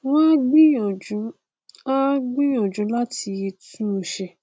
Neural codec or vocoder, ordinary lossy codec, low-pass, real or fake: none; none; none; real